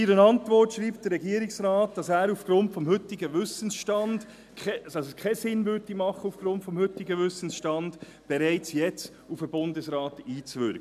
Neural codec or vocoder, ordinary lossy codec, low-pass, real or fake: none; none; 14.4 kHz; real